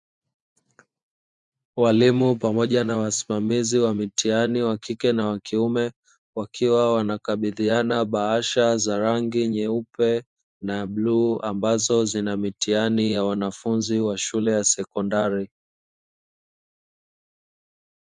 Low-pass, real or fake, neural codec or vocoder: 10.8 kHz; fake; vocoder, 24 kHz, 100 mel bands, Vocos